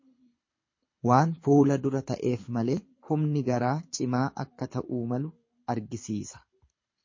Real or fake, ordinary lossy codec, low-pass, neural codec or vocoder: fake; MP3, 32 kbps; 7.2 kHz; codec, 24 kHz, 6 kbps, HILCodec